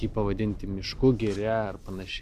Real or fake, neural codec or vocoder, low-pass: real; none; 14.4 kHz